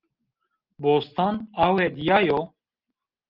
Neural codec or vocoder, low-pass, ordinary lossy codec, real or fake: none; 5.4 kHz; Opus, 32 kbps; real